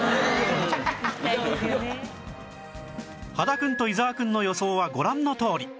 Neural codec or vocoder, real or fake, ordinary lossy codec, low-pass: none; real; none; none